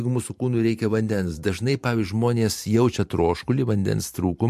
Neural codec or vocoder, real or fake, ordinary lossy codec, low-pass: vocoder, 44.1 kHz, 128 mel bands every 512 samples, BigVGAN v2; fake; MP3, 64 kbps; 14.4 kHz